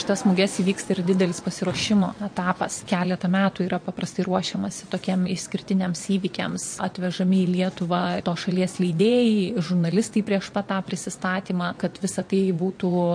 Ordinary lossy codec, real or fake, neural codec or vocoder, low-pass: MP3, 48 kbps; fake; vocoder, 22.05 kHz, 80 mel bands, WaveNeXt; 9.9 kHz